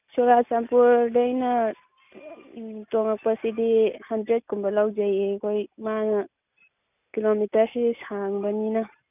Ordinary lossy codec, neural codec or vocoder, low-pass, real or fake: none; none; 3.6 kHz; real